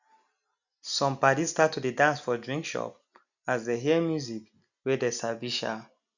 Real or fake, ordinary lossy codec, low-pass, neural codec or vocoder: real; none; 7.2 kHz; none